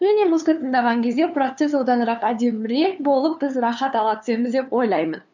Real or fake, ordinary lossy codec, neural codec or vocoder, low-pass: fake; none; codec, 16 kHz, 2 kbps, FunCodec, trained on LibriTTS, 25 frames a second; 7.2 kHz